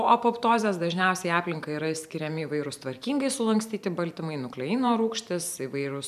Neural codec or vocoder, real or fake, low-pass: vocoder, 44.1 kHz, 128 mel bands every 512 samples, BigVGAN v2; fake; 14.4 kHz